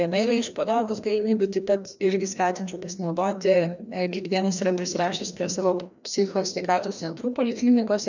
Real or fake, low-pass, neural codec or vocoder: fake; 7.2 kHz; codec, 16 kHz, 1 kbps, FreqCodec, larger model